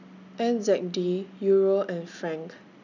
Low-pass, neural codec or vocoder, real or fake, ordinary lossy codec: 7.2 kHz; none; real; none